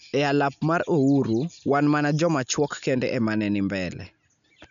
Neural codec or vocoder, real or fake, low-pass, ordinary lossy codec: none; real; 7.2 kHz; none